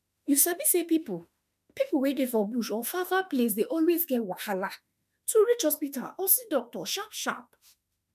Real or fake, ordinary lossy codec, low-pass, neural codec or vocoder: fake; none; 14.4 kHz; autoencoder, 48 kHz, 32 numbers a frame, DAC-VAE, trained on Japanese speech